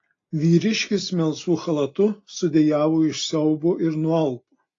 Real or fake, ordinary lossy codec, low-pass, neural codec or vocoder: real; AAC, 32 kbps; 7.2 kHz; none